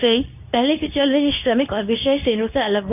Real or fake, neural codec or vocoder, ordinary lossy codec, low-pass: fake; codec, 24 kHz, 0.9 kbps, WavTokenizer, medium speech release version 2; none; 3.6 kHz